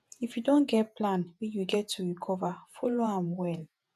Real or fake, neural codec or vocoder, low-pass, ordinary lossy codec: fake; vocoder, 48 kHz, 128 mel bands, Vocos; 14.4 kHz; none